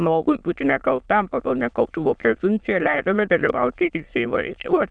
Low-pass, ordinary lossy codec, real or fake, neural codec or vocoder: 9.9 kHz; Opus, 64 kbps; fake; autoencoder, 22.05 kHz, a latent of 192 numbers a frame, VITS, trained on many speakers